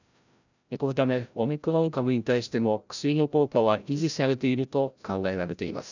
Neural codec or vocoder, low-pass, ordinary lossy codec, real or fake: codec, 16 kHz, 0.5 kbps, FreqCodec, larger model; 7.2 kHz; none; fake